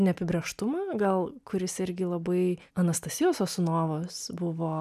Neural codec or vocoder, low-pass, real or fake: none; 14.4 kHz; real